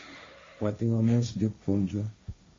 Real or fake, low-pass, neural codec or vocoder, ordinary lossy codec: fake; 7.2 kHz; codec, 16 kHz, 1.1 kbps, Voila-Tokenizer; MP3, 32 kbps